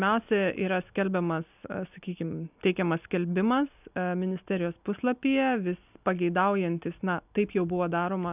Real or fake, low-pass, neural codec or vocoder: real; 3.6 kHz; none